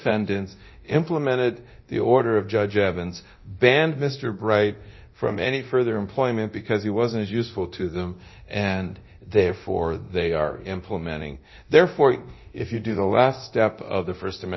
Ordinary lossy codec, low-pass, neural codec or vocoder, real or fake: MP3, 24 kbps; 7.2 kHz; codec, 24 kHz, 0.9 kbps, DualCodec; fake